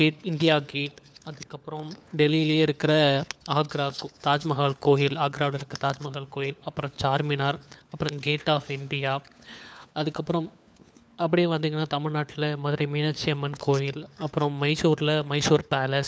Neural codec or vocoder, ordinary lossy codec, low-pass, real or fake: codec, 16 kHz, 4 kbps, FunCodec, trained on LibriTTS, 50 frames a second; none; none; fake